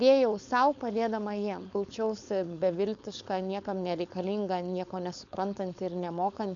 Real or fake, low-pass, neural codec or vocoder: fake; 7.2 kHz; codec, 16 kHz, 4.8 kbps, FACodec